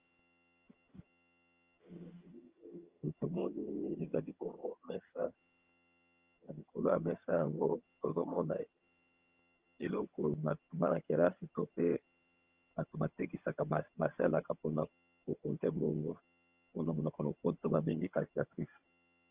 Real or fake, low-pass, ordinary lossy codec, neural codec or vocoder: fake; 3.6 kHz; Opus, 24 kbps; vocoder, 22.05 kHz, 80 mel bands, HiFi-GAN